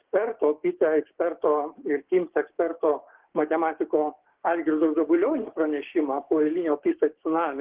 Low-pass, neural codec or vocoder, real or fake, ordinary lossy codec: 3.6 kHz; codec, 16 kHz, 6 kbps, DAC; fake; Opus, 16 kbps